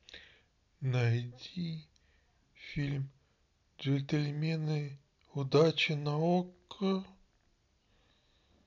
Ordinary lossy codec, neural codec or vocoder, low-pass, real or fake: none; none; 7.2 kHz; real